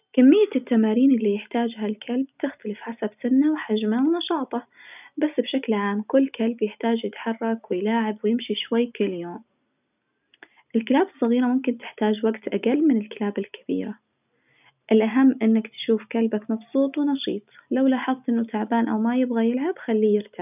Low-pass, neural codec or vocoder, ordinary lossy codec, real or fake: 3.6 kHz; none; none; real